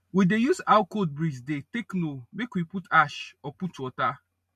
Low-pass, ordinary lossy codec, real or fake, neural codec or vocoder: 14.4 kHz; MP3, 64 kbps; real; none